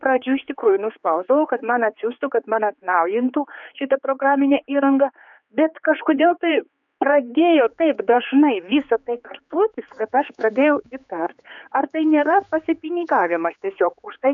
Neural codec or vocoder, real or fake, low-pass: codec, 16 kHz, 4 kbps, X-Codec, HuBERT features, trained on general audio; fake; 7.2 kHz